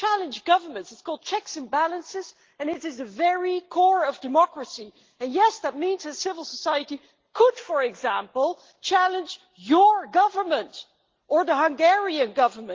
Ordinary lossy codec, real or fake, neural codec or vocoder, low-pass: Opus, 16 kbps; real; none; 7.2 kHz